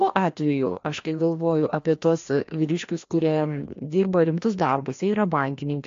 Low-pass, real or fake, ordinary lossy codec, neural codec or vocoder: 7.2 kHz; fake; AAC, 48 kbps; codec, 16 kHz, 1 kbps, FreqCodec, larger model